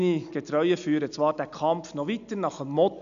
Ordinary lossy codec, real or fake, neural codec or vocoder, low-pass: none; real; none; 7.2 kHz